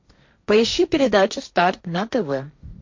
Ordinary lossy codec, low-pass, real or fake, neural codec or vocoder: MP3, 48 kbps; 7.2 kHz; fake; codec, 16 kHz, 1.1 kbps, Voila-Tokenizer